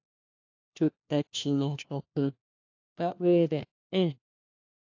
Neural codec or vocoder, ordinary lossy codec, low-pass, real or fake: codec, 16 kHz, 1 kbps, FunCodec, trained on LibriTTS, 50 frames a second; AAC, 48 kbps; 7.2 kHz; fake